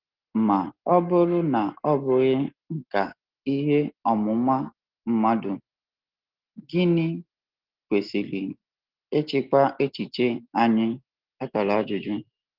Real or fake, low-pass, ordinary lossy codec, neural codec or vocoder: real; 5.4 kHz; Opus, 16 kbps; none